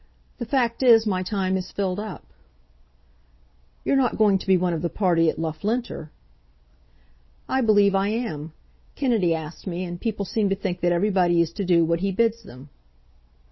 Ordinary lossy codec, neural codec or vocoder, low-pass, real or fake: MP3, 24 kbps; none; 7.2 kHz; real